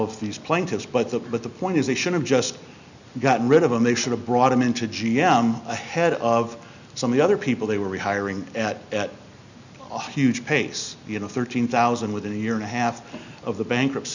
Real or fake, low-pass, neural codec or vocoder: real; 7.2 kHz; none